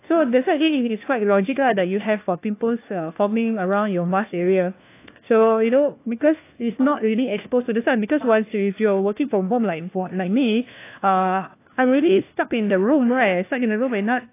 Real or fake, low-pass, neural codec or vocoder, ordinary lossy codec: fake; 3.6 kHz; codec, 16 kHz, 1 kbps, FunCodec, trained on LibriTTS, 50 frames a second; AAC, 24 kbps